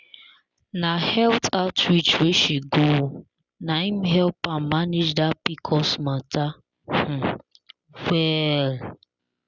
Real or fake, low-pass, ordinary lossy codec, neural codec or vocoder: real; 7.2 kHz; Opus, 64 kbps; none